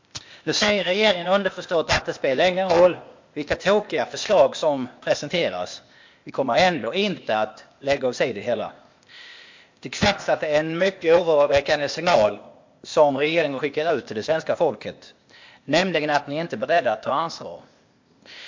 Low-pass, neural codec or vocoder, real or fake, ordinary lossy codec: 7.2 kHz; codec, 16 kHz, 0.8 kbps, ZipCodec; fake; MP3, 48 kbps